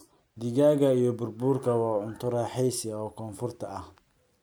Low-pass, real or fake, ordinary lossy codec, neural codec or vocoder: none; real; none; none